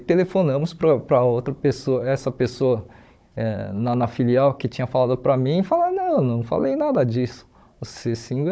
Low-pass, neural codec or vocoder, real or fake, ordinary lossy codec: none; codec, 16 kHz, 16 kbps, FunCodec, trained on Chinese and English, 50 frames a second; fake; none